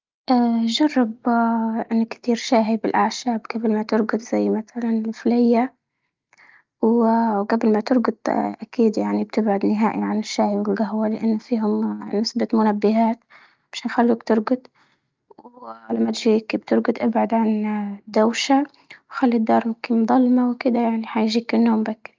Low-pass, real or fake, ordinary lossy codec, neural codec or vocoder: 7.2 kHz; real; Opus, 24 kbps; none